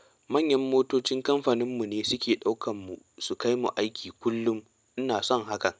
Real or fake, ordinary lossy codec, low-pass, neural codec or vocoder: real; none; none; none